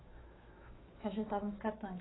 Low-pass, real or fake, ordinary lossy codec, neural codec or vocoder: 7.2 kHz; fake; AAC, 16 kbps; autoencoder, 48 kHz, 128 numbers a frame, DAC-VAE, trained on Japanese speech